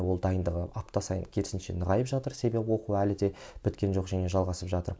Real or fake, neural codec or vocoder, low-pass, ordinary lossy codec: real; none; none; none